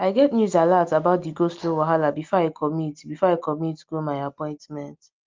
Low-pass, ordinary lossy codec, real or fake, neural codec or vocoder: 7.2 kHz; Opus, 24 kbps; real; none